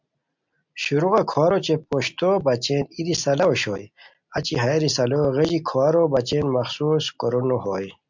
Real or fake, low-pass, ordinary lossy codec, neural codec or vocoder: real; 7.2 kHz; MP3, 64 kbps; none